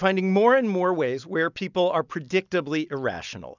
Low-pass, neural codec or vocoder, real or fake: 7.2 kHz; none; real